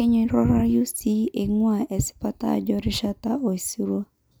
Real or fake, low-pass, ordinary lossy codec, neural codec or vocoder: real; none; none; none